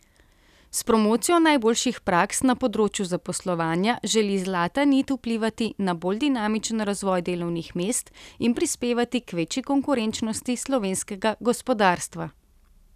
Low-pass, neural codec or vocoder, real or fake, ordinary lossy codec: 14.4 kHz; none; real; none